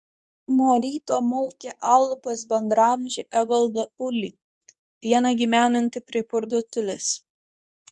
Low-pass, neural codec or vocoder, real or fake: 10.8 kHz; codec, 24 kHz, 0.9 kbps, WavTokenizer, medium speech release version 2; fake